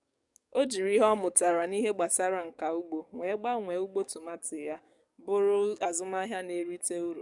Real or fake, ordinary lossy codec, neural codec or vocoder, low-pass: fake; none; codec, 44.1 kHz, 7.8 kbps, DAC; 10.8 kHz